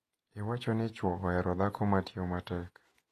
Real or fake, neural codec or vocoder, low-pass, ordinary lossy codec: fake; vocoder, 48 kHz, 128 mel bands, Vocos; 14.4 kHz; AAC, 48 kbps